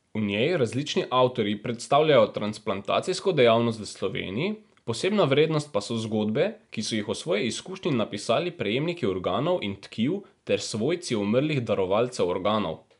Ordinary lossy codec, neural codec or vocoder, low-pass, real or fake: none; none; 10.8 kHz; real